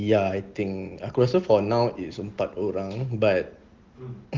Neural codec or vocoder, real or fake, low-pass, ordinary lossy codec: none; real; 7.2 kHz; Opus, 16 kbps